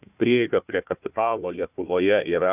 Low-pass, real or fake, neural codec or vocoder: 3.6 kHz; fake; codec, 16 kHz, 1 kbps, FunCodec, trained on Chinese and English, 50 frames a second